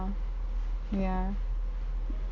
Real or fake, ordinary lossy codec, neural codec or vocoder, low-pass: real; none; none; 7.2 kHz